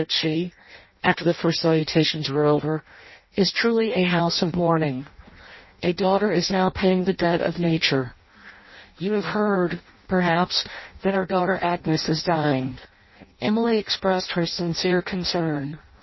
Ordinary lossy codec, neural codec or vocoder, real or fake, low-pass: MP3, 24 kbps; codec, 16 kHz in and 24 kHz out, 0.6 kbps, FireRedTTS-2 codec; fake; 7.2 kHz